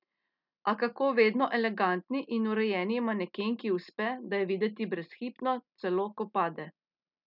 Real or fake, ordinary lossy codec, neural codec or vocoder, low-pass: real; none; none; 5.4 kHz